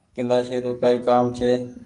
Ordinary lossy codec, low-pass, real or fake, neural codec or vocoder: MP3, 64 kbps; 10.8 kHz; fake; codec, 44.1 kHz, 2.6 kbps, SNAC